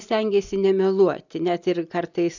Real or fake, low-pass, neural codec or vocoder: real; 7.2 kHz; none